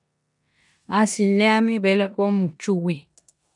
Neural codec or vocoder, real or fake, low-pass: codec, 16 kHz in and 24 kHz out, 0.9 kbps, LongCat-Audio-Codec, four codebook decoder; fake; 10.8 kHz